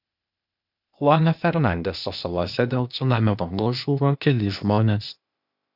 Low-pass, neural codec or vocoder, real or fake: 5.4 kHz; codec, 16 kHz, 0.8 kbps, ZipCodec; fake